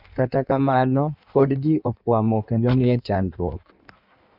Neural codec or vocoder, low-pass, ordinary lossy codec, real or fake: codec, 16 kHz in and 24 kHz out, 1.1 kbps, FireRedTTS-2 codec; 5.4 kHz; none; fake